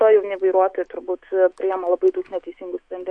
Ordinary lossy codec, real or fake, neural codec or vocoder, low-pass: MP3, 48 kbps; fake; autoencoder, 48 kHz, 128 numbers a frame, DAC-VAE, trained on Japanese speech; 9.9 kHz